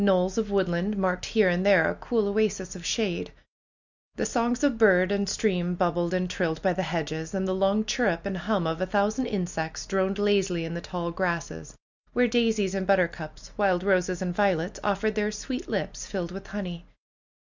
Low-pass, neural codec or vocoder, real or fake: 7.2 kHz; none; real